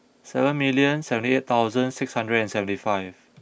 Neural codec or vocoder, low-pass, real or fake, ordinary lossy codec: none; none; real; none